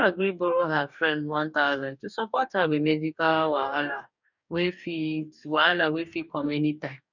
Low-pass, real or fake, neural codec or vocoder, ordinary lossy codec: 7.2 kHz; fake; codec, 44.1 kHz, 2.6 kbps, DAC; none